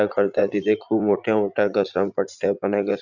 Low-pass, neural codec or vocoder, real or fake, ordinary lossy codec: 7.2 kHz; vocoder, 44.1 kHz, 80 mel bands, Vocos; fake; none